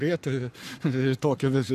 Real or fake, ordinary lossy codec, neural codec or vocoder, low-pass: fake; AAC, 96 kbps; codec, 44.1 kHz, 7.8 kbps, Pupu-Codec; 14.4 kHz